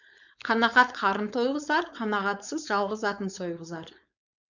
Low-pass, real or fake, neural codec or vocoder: 7.2 kHz; fake; codec, 16 kHz, 4.8 kbps, FACodec